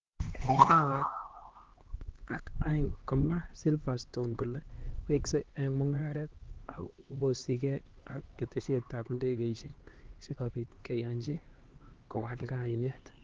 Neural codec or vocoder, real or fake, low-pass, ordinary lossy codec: codec, 16 kHz, 2 kbps, X-Codec, HuBERT features, trained on LibriSpeech; fake; 7.2 kHz; Opus, 16 kbps